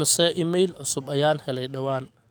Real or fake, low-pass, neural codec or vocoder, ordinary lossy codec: fake; none; codec, 44.1 kHz, 7.8 kbps, DAC; none